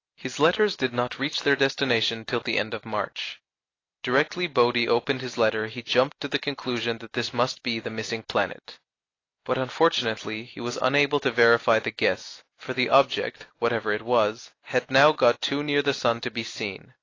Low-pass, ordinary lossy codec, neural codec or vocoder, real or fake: 7.2 kHz; AAC, 32 kbps; none; real